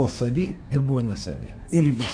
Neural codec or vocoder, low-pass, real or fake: codec, 24 kHz, 1 kbps, SNAC; 9.9 kHz; fake